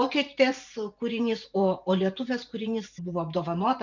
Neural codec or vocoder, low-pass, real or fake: vocoder, 44.1 kHz, 128 mel bands every 512 samples, BigVGAN v2; 7.2 kHz; fake